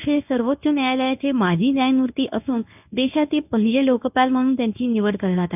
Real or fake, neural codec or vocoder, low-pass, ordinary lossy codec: fake; codec, 24 kHz, 0.9 kbps, WavTokenizer, medium speech release version 1; 3.6 kHz; none